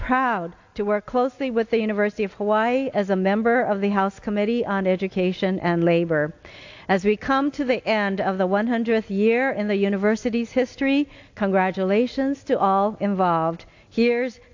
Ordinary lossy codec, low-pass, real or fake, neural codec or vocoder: AAC, 48 kbps; 7.2 kHz; real; none